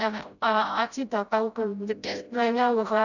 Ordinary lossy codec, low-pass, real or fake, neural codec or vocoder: none; 7.2 kHz; fake; codec, 16 kHz, 0.5 kbps, FreqCodec, smaller model